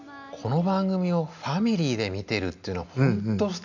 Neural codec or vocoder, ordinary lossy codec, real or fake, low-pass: none; none; real; 7.2 kHz